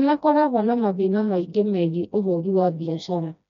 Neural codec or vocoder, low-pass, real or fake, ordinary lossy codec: codec, 16 kHz, 1 kbps, FreqCodec, smaller model; 7.2 kHz; fake; MP3, 64 kbps